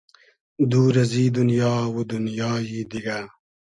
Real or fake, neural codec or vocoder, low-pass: real; none; 10.8 kHz